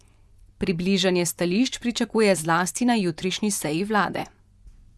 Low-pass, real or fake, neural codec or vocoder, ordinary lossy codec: none; fake; vocoder, 24 kHz, 100 mel bands, Vocos; none